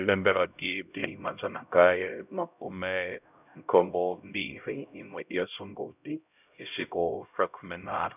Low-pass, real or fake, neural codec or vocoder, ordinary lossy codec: 3.6 kHz; fake; codec, 16 kHz, 0.5 kbps, X-Codec, HuBERT features, trained on LibriSpeech; none